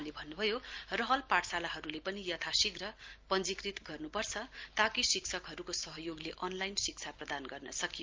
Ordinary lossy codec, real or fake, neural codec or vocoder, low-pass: Opus, 32 kbps; real; none; 7.2 kHz